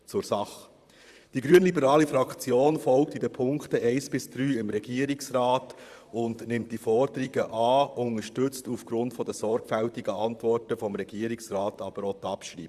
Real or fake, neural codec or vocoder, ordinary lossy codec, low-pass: fake; vocoder, 44.1 kHz, 128 mel bands, Pupu-Vocoder; Opus, 64 kbps; 14.4 kHz